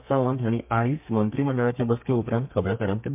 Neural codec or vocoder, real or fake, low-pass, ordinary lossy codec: codec, 32 kHz, 1.9 kbps, SNAC; fake; 3.6 kHz; MP3, 32 kbps